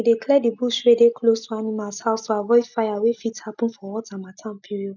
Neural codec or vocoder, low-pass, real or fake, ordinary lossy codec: none; 7.2 kHz; real; none